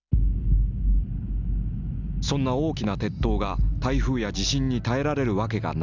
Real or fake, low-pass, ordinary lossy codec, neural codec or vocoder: real; 7.2 kHz; none; none